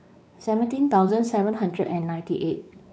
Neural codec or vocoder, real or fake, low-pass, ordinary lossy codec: codec, 16 kHz, 4 kbps, X-Codec, WavLM features, trained on Multilingual LibriSpeech; fake; none; none